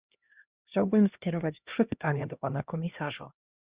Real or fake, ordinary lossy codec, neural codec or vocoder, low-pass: fake; Opus, 64 kbps; codec, 16 kHz, 1 kbps, X-Codec, HuBERT features, trained on LibriSpeech; 3.6 kHz